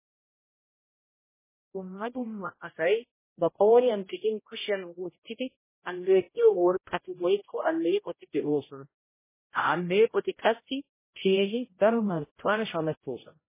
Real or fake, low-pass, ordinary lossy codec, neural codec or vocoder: fake; 3.6 kHz; MP3, 16 kbps; codec, 16 kHz, 0.5 kbps, X-Codec, HuBERT features, trained on general audio